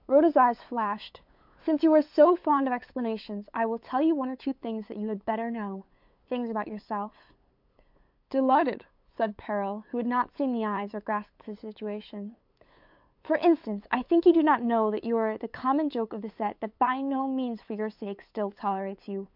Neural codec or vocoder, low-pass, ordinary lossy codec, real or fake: codec, 16 kHz, 8 kbps, FunCodec, trained on LibriTTS, 25 frames a second; 5.4 kHz; MP3, 48 kbps; fake